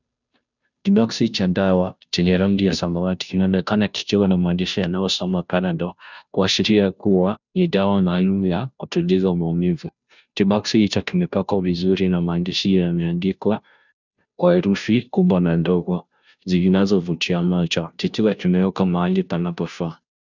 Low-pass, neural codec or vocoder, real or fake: 7.2 kHz; codec, 16 kHz, 0.5 kbps, FunCodec, trained on Chinese and English, 25 frames a second; fake